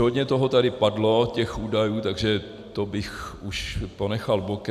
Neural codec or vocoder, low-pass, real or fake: none; 14.4 kHz; real